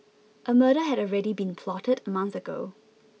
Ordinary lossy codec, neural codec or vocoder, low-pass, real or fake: none; none; none; real